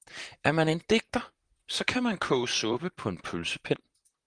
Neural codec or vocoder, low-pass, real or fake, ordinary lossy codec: vocoder, 44.1 kHz, 128 mel bands, Pupu-Vocoder; 9.9 kHz; fake; Opus, 24 kbps